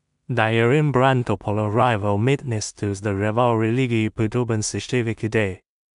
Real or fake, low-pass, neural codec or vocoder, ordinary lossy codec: fake; 10.8 kHz; codec, 16 kHz in and 24 kHz out, 0.4 kbps, LongCat-Audio-Codec, two codebook decoder; none